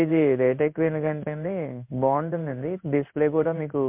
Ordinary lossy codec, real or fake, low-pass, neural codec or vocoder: MP3, 32 kbps; fake; 3.6 kHz; codec, 16 kHz in and 24 kHz out, 1 kbps, XY-Tokenizer